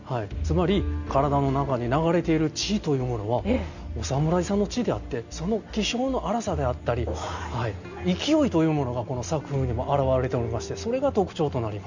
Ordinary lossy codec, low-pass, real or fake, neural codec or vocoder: none; 7.2 kHz; real; none